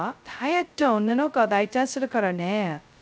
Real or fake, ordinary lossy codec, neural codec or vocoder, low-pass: fake; none; codec, 16 kHz, 0.2 kbps, FocalCodec; none